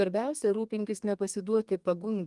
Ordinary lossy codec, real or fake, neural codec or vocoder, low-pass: Opus, 24 kbps; fake; codec, 32 kHz, 1.9 kbps, SNAC; 10.8 kHz